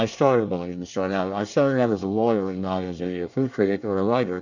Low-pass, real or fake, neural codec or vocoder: 7.2 kHz; fake; codec, 24 kHz, 1 kbps, SNAC